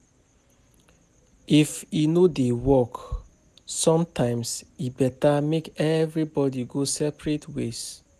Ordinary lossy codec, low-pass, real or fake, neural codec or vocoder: none; 14.4 kHz; real; none